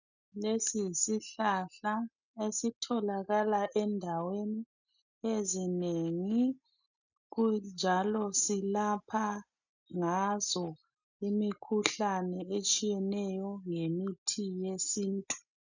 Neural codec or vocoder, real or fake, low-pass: none; real; 7.2 kHz